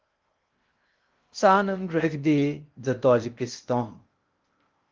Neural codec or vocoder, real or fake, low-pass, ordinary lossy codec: codec, 16 kHz in and 24 kHz out, 0.6 kbps, FocalCodec, streaming, 2048 codes; fake; 7.2 kHz; Opus, 24 kbps